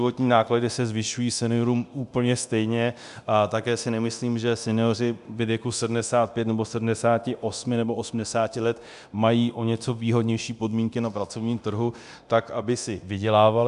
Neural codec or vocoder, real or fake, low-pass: codec, 24 kHz, 0.9 kbps, DualCodec; fake; 10.8 kHz